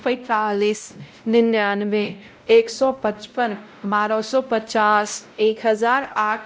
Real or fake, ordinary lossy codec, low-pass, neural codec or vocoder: fake; none; none; codec, 16 kHz, 0.5 kbps, X-Codec, WavLM features, trained on Multilingual LibriSpeech